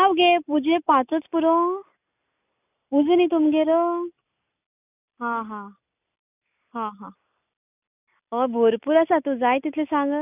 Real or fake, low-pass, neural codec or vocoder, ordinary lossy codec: real; 3.6 kHz; none; none